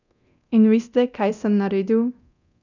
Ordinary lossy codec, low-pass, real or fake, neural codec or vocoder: none; 7.2 kHz; fake; codec, 24 kHz, 0.9 kbps, DualCodec